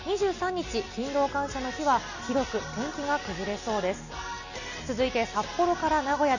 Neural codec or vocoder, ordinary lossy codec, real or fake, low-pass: none; none; real; 7.2 kHz